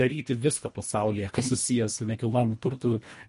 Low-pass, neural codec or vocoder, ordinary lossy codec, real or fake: 10.8 kHz; codec, 24 kHz, 1.5 kbps, HILCodec; MP3, 48 kbps; fake